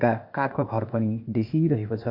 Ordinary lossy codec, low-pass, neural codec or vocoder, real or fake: MP3, 48 kbps; 5.4 kHz; codec, 16 kHz, 0.8 kbps, ZipCodec; fake